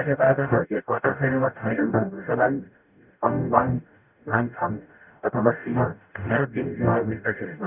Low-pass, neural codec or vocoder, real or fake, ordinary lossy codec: 3.6 kHz; codec, 44.1 kHz, 0.9 kbps, DAC; fake; none